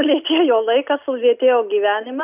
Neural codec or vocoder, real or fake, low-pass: none; real; 3.6 kHz